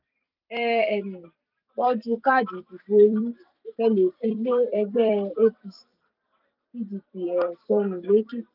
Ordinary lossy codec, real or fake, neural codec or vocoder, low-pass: none; fake; vocoder, 44.1 kHz, 128 mel bands every 256 samples, BigVGAN v2; 5.4 kHz